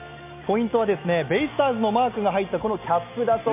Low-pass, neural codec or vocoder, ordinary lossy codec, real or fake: 3.6 kHz; none; MP3, 32 kbps; real